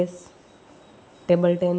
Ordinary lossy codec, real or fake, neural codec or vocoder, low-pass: none; real; none; none